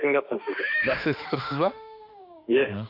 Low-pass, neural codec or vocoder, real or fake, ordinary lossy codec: 5.4 kHz; autoencoder, 48 kHz, 32 numbers a frame, DAC-VAE, trained on Japanese speech; fake; none